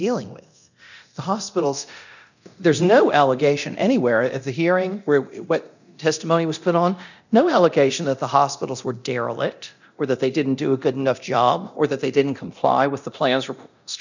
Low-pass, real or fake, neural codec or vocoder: 7.2 kHz; fake; codec, 24 kHz, 0.9 kbps, DualCodec